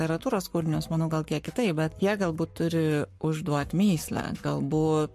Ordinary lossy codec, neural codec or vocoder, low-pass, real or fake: MP3, 64 kbps; codec, 44.1 kHz, 7.8 kbps, Pupu-Codec; 14.4 kHz; fake